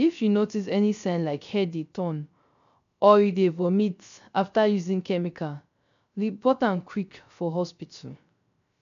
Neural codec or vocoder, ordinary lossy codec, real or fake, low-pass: codec, 16 kHz, 0.3 kbps, FocalCodec; AAC, 96 kbps; fake; 7.2 kHz